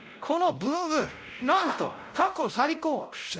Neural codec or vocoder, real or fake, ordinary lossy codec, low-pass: codec, 16 kHz, 0.5 kbps, X-Codec, WavLM features, trained on Multilingual LibriSpeech; fake; none; none